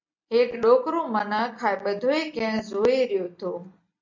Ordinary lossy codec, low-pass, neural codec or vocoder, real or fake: MP3, 64 kbps; 7.2 kHz; none; real